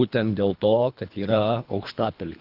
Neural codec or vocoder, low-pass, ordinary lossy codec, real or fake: codec, 24 kHz, 3 kbps, HILCodec; 5.4 kHz; Opus, 32 kbps; fake